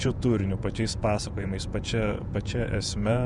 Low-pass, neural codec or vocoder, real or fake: 10.8 kHz; vocoder, 48 kHz, 128 mel bands, Vocos; fake